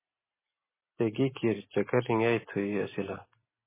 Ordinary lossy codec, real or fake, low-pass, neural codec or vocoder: MP3, 16 kbps; real; 3.6 kHz; none